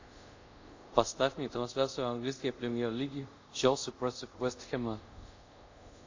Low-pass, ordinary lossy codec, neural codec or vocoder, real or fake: 7.2 kHz; AAC, 48 kbps; codec, 24 kHz, 0.5 kbps, DualCodec; fake